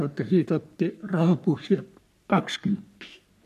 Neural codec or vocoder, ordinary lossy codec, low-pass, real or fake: codec, 44.1 kHz, 3.4 kbps, Pupu-Codec; none; 14.4 kHz; fake